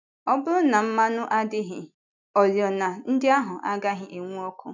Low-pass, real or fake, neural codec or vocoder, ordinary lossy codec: 7.2 kHz; real; none; none